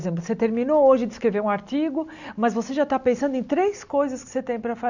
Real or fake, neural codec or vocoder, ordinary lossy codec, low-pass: real; none; none; 7.2 kHz